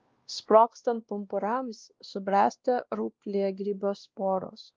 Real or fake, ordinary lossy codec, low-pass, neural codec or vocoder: fake; Opus, 24 kbps; 7.2 kHz; codec, 16 kHz, 1 kbps, X-Codec, WavLM features, trained on Multilingual LibriSpeech